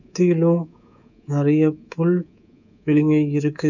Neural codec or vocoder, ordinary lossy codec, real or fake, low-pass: codec, 24 kHz, 3.1 kbps, DualCodec; none; fake; 7.2 kHz